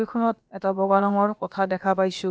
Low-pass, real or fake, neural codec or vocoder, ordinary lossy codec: none; fake; codec, 16 kHz, about 1 kbps, DyCAST, with the encoder's durations; none